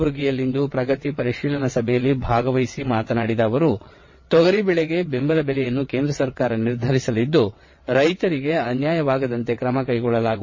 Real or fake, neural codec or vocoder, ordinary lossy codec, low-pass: fake; vocoder, 22.05 kHz, 80 mel bands, WaveNeXt; MP3, 32 kbps; 7.2 kHz